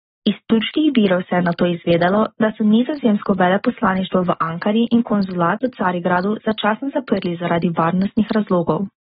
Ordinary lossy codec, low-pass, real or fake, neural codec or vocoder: AAC, 16 kbps; 19.8 kHz; real; none